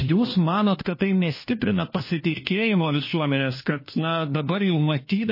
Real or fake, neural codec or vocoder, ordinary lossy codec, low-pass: fake; codec, 16 kHz, 1 kbps, FunCodec, trained on LibriTTS, 50 frames a second; MP3, 24 kbps; 5.4 kHz